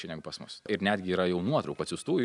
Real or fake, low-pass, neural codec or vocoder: real; 10.8 kHz; none